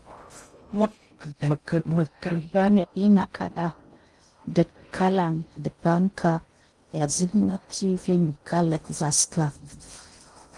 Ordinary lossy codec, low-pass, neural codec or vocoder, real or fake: Opus, 32 kbps; 10.8 kHz; codec, 16 kHz in and 24 kHz out, 0.6 kbps, FocalCodec, streaming, 2048 codes; fake